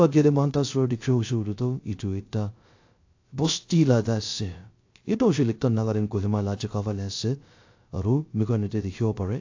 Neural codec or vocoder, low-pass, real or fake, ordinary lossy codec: codec, 16 kHz, 0.3 kbps, FocalCodec; 7.2 kHz; fake; AAC, 48 kbps